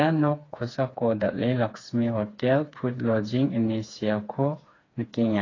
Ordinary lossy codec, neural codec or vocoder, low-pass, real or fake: AAC, 32 kbps; codec, 16 kHz, 4 kbps, FreqCodec, smaller model; 7.2 kHz; fake